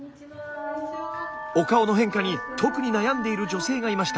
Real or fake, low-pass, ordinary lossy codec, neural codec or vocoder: real; none; none; none